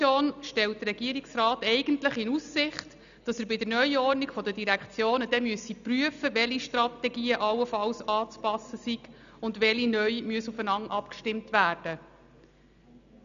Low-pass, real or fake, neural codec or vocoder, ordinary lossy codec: 7.2 kHz; real; none; none